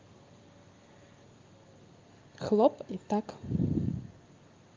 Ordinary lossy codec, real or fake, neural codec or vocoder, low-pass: Opus, 32 kbps; real; none; 7.2 kHz